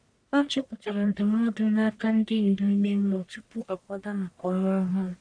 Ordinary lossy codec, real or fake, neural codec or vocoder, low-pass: none; fake; codec, 44.1 kHz, 1.7 kbps, Pupu-Codec; 9.9 kHz